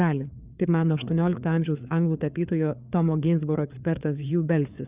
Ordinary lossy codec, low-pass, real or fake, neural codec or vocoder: Opus, 64 kbps; 3.6 kHz; fake; codec, 16 kHz, 4 kbps, FreqCodec, larger model